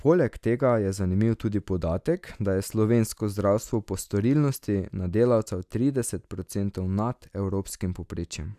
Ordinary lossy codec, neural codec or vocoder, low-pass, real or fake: none; none; 14.4 kHz; real